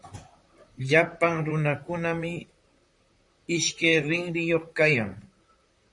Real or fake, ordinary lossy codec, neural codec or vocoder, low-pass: fake; MP3, 48 kbps; vocoder, 44.1 kHz, 128 mel bands, Pupu-Vocoder; 10.8 kHz